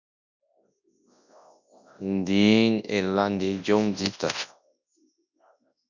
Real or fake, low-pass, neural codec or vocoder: fake; 7.2 kHz; codec, 24 kHz, 0.9 kbps, WavTokenizer, large speech release